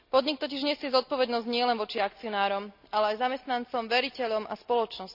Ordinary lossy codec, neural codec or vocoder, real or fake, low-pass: none; none; real; 5.4 kHz